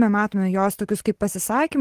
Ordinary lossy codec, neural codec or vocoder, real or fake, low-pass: Opus, 16 kbps; none; real; 14.4 kHz